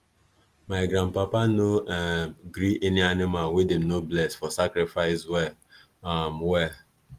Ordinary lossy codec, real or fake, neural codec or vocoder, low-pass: Opus, 24 kbps; real; none; 14.4 kHz